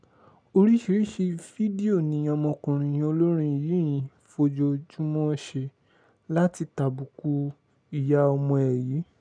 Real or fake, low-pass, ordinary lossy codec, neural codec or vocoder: real; 9.9 kHz; none; none